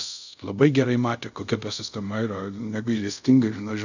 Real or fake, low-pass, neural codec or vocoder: fake; 7.2 kHz; codec, 24 kHz, 0.5 kbps, DualCodec